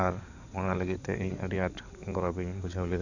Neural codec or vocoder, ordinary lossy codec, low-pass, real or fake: codec, 16 kHz in and 24 kHz out, 2.2 kbps, FireRedTTS-2 codec; none; 7.2 kHz; fake